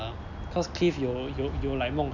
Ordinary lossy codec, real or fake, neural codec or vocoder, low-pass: none; real; none; 7.2 kHz